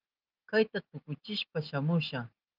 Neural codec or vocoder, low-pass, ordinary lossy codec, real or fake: none; 5.4 kHz; Opus, 32 kbps; real